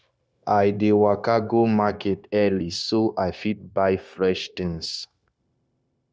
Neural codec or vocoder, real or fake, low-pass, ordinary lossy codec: codec, 16 kHz, 0.9 kbps, LongCat-Audio-Codec; fake; none; none